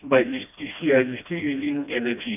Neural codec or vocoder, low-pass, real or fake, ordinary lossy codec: codec, 16 kHz, 1 kbps, FreqCodec, smaller model; 3.6 kHz; fake; none